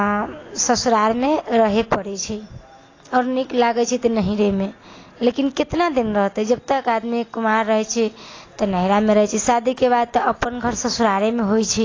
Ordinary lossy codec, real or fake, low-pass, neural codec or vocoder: AAC, 32 kbps; real; 7.2 kHz; none